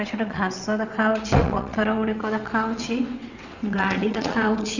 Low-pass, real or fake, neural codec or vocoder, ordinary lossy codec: 7.2 kHz; fake; vocoder, 22.05 kHz, 80 mel bands, WaveNeXt; Opus, 64 kbps